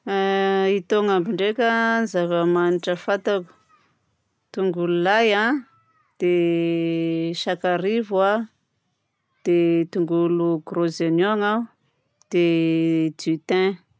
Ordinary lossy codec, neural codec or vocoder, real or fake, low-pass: none; none; real; none